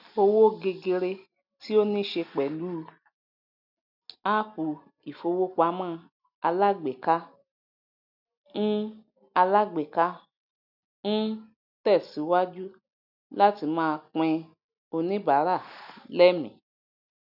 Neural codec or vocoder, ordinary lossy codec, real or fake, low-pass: none; none; real; 5.4 kHz